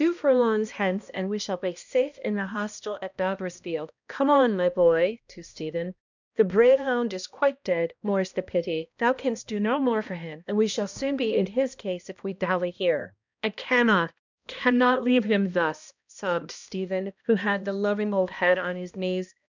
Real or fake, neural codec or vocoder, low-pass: fake; codec, 16 kHz, 1 kbps, X-Codec, HuBERT features, trained on balanced general audio; 7.2 kHz